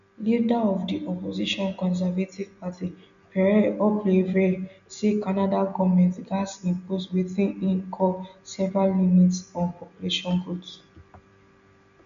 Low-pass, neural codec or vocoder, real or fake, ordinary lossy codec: 7.2 kHz; none; real; none